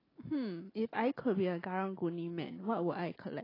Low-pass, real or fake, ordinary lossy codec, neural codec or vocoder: 5.4 kHz; real; AAC, 24 kbps; none